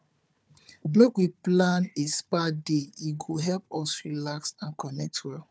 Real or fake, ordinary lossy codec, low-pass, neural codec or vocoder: fake; none; none; codec, 16 kHz, 4 kbps, FunCodec, trained on Chinese and English, 50 frames a second